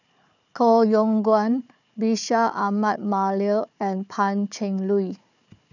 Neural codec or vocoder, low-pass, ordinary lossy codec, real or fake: codec, 16 kHz, 4 kbps, FunCodec, trained on Chinese and English, 50 frames a second; 7.2 kHz; none; fake